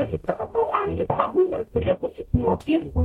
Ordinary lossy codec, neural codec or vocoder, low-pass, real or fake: MP3, 64 kbps; codec, 44.1 kHz, 0.9 kbps, DAC; 19.8 kHz; fake